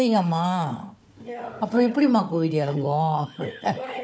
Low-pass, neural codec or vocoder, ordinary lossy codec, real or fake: none; codec, 16 kHz, 4 kbps, FunCodec, trained on Chinese and English, 50 frames a second; none; fake